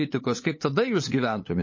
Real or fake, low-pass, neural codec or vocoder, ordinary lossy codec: fake; 7.2 kHz; codec, 16 kHz, 8 kbps, FunCodec, trained on LibriTTS, 25 frames a second; MP3, 32 kbps